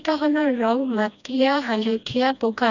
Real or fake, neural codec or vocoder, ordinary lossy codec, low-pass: fake; codec, 16 kHz, 1 kbps, FreqCodec, smaller model; none; 7.2 kHz